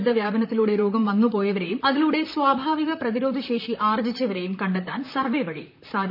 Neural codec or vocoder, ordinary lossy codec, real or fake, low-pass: vocoder, 44.1 kHz, 128 mel bands, Pupu-Vocoder; none; fake; 5.4 kHz